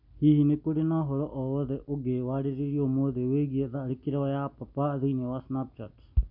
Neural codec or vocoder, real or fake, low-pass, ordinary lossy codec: none; real; 5.4 kHz; none